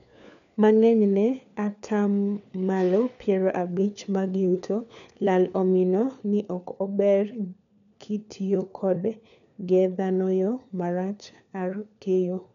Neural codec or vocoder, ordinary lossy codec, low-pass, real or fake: codec, 16 kHz, 4 kbps, FunCodec, trained on LibriTTS, 50 frames a second; none; 7.2 kHz; fake